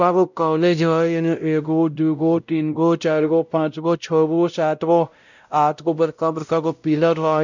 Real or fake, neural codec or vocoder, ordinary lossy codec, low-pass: fake; codec, 16 kHz, 0.5 kbps, X-Codec, WavLM features, trained on Multilingual LibriSpeech; none; 7.2 kHz